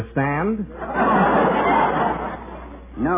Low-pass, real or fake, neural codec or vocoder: 3.6 kHz; real; none